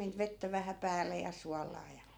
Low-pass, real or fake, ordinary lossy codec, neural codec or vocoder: none; real; none; none